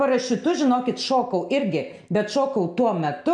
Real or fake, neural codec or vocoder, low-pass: real; none; 9.9 kHz